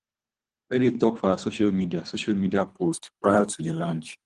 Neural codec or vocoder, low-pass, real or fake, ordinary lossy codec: codec, 24 kHz, 3 kbps, HILCodec; 10.8 kHz; fake; Opus, 24 kbps